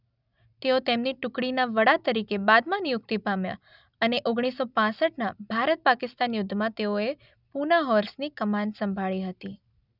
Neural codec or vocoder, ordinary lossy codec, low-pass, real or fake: none; none; 5.4 kHz; real